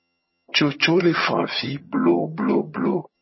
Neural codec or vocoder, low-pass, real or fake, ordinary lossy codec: vocoder, 22.05 kHz, 80 mel bands, HiFi-GAN; 7.2 kHz; fake; MP3, 24 kbps